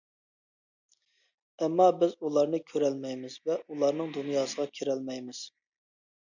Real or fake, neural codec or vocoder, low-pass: real; none; 7.2 kHz